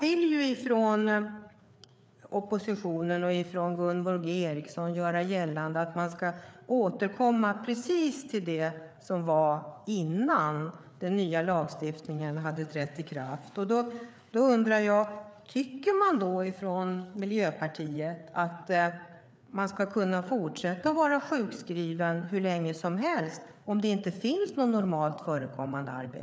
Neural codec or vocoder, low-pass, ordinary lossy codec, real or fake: codec, 16 kHz, 4 kbps, FreqCodec, larger model; none; none; fake